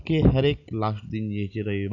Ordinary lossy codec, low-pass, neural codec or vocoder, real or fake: none; 7.2 kHz; none; real